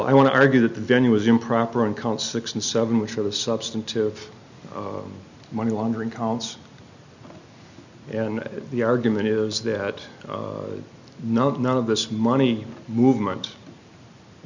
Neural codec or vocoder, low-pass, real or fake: none; 7.2 kHz; real